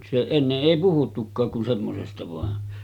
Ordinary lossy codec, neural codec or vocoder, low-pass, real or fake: none; none; 19.8 kHz; real